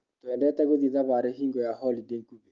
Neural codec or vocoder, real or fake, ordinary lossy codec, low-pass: none; real; Opus, 32 kbps; 7.2 kHz